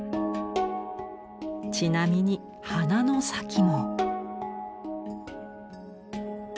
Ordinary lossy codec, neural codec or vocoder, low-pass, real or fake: none; none; none; real